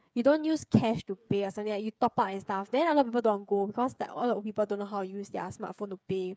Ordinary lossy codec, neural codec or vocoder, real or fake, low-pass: none; codec, 16 kHz, 8 kbps, FreqCodec, smaller model; fake; none